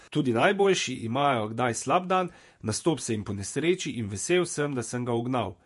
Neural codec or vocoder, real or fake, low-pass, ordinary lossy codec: vocoder, 48 kHz, 128 mel bands, Vocos; fake; 14.4 kHz; MP3, 48 kbps